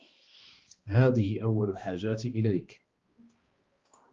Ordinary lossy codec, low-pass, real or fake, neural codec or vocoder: Opus, 32 kbps; 7.2 kHz; fake; codec, 16 kHz, 1 kbps, X-Codec, HuBERT features, trained on balanced general audio